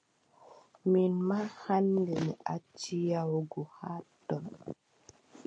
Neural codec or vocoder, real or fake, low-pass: none; real; 9.9 kHz